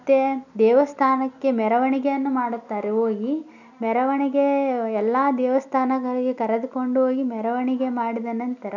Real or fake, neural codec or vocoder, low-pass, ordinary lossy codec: real; none; 7.2 kHz; none